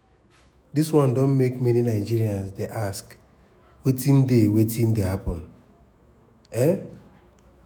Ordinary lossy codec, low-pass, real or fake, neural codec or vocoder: none; none; fake; autoencoder, 48 kHz, 128 numbers a frame, DAC-VAE, trained on Japanese speech